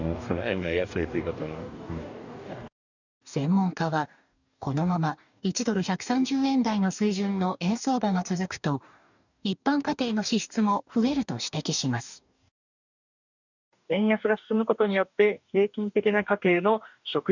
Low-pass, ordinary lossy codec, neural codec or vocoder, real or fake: 7.2 kHz; none; codec, 44.1 kHz, 2.6 kbps, DAC; fake